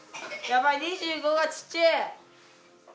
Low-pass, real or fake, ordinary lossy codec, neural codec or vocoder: none; real; none; none